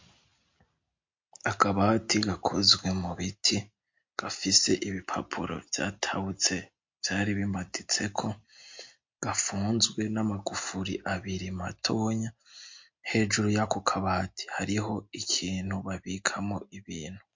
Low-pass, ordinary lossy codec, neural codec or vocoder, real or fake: 7.2 kHz; MP3, 48 kbps; none; real